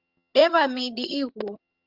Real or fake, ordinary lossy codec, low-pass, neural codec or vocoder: fake; Opus, 24 kbps; 5.4 kHz; vocoder, 22.05 kHz, 80 mel bands, HiFi-GAN